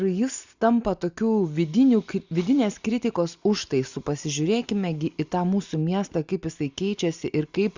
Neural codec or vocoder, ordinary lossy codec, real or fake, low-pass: none; Opus, 64 kbps; real; 7.2 kHz